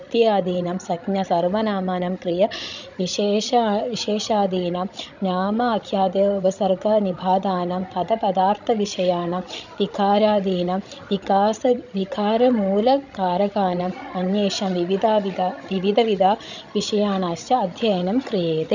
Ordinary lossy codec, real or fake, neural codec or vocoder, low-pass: none; fake; codec, 16 kHz, 16 kbps, FreqCodec, larger model; 7.2 kHz